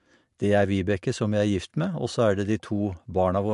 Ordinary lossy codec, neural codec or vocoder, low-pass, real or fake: MP3, 64 kbps; none; 10.8 kHz; real